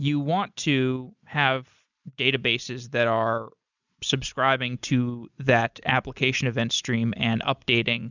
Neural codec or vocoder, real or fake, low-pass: none; real; 7.2 kHz